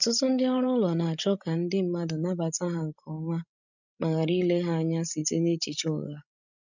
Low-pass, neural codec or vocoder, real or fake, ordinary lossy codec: 7.2 kHz; codec, 16 kHz, 16 kbps, FreqCodec, larger model; fake; none